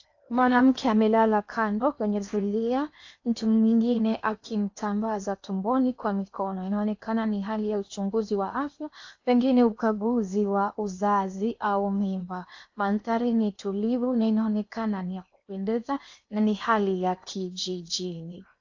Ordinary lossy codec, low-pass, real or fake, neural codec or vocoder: AAC, 48 kbps; 7.2 kHz; fake; codec, 16 kHz in and 24 kHz out, 0.8 kbps, FocalCodec, streaming, 65536 codes